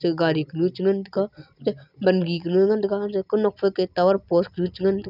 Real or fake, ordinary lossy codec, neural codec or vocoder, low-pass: real; none; none; 5.4 kHz